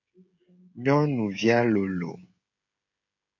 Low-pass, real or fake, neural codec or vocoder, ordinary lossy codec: 7.2 kHz; fake; codec, 16 kHz, 16 kbps, FreqCodec, smaller model; MP3, 64 kbps